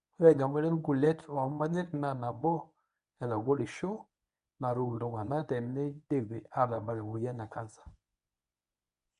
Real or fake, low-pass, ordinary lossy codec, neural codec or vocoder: fake; 10.8 kHz; none; codec, 24 kHz, 0.9 kbps, WavTokenizer, medium speech release version 1